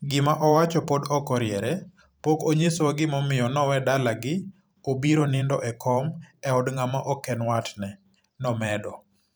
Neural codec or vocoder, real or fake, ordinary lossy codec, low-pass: vocoder, 44.1 kHz, 128 mel bands every 512 samples, BigVGAN v2; fake; none; none